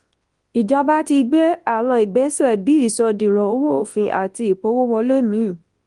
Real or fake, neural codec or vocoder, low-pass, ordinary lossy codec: fake; codec, 24 kHz, 0.9 kbps, WavTokenizer, large speech release; 10.8 kHz; Opus, 24 kbps